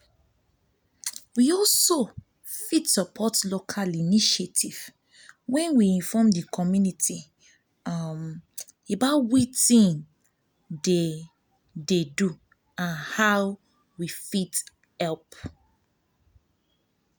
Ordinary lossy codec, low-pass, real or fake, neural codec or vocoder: none; none; real; none